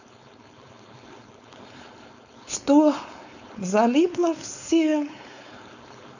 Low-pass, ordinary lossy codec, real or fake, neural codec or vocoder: 7.2 kHz; none; fake; codec, 16 kHz, 4.8 kbps, FACodec